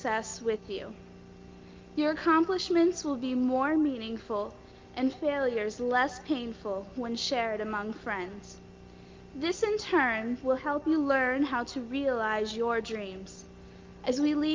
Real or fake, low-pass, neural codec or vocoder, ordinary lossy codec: real; 7.2 kHz; none; Opus, 24 kbps